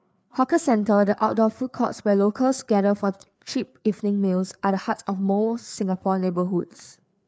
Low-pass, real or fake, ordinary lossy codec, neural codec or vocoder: none; fake; none; codec, 16 kHz, 4 kbps, FreqCodec, larger model